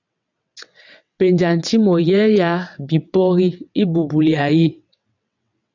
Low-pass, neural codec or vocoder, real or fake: 7.2 kHz; vocoder, 22.05 kHz, 80 mel bands, WaveNeXt; fake